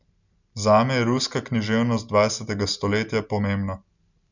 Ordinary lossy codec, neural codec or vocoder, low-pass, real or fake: none; none; 7.2 kHz; real